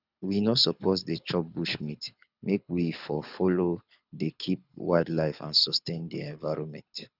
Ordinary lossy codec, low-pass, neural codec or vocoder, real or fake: none; 5.4 kHz; codec, 24 kHz, 6 kbps, HILCodec; fake